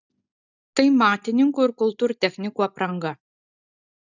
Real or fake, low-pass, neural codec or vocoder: real; 7.2 kHz; none